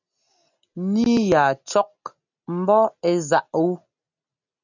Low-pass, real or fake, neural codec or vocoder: 7.2 kHz; real; none